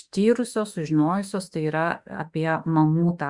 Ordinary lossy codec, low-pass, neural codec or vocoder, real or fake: Opus, 64 kbps; 10.8 kHz; autoencoder, 48 kHz, 32 numbers a frame, DAC-VAE, trained on Japanese speech; fake